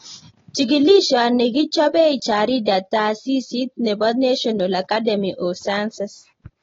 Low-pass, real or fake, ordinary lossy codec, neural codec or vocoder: 7.2 kHz; real; AAC, 24 kbps; none